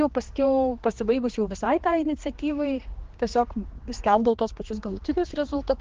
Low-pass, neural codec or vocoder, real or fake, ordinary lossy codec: 7.2 kHz; codec, 16 kHz, 2 kbps, X-Codec, HuBERT features, trained on general audio; fake; Opus, 16 kbps